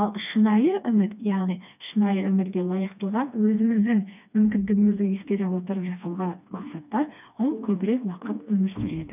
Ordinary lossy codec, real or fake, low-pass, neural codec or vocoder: none; fake; 3.6 kHz; codec, 16 kHz, 2 kbps, FreqCodec, smaller model